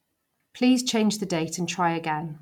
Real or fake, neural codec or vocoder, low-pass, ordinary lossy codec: real; none; 19.8 kHz; none